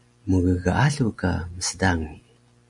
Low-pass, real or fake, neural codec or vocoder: 10.8 kHz; real; none